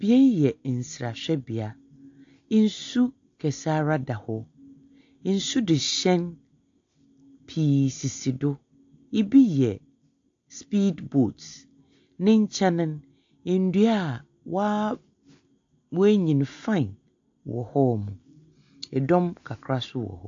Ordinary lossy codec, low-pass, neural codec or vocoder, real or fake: AAC, 48 kbps; 7.2 kHz; none; real